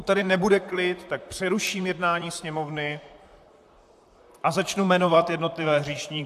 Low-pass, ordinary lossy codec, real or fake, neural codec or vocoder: 14.4 kHz; AAC, 96 kbps; fake; vocoder, 44.1 kHz, 128 mel bands, Pupu-Vocoder